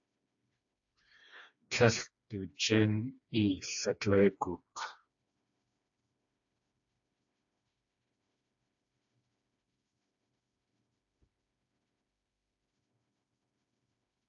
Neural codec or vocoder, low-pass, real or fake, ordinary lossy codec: codec, 16 kHz, 2 kbps, FreqCodec, smaller model; 7.2 kHz; fake; AAC, 64 kbps